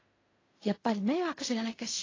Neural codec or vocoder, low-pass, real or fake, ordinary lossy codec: codec, 16 kHz in and 24 kHz out, 0.4 kbps, LongCat-Audio-Codec, fine tuned four codebook decoder; 7.2 kHz; fake; AAC, 32 kbps